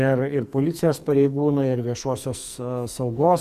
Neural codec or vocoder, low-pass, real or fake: codec, 44.1 kHz, 2.6 kbps, SNAC; 14.4 kHz; fake